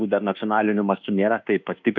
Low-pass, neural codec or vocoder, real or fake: 7.2 kHz; codec, 24 kHz, 1.2 kbps, DualCodec; fake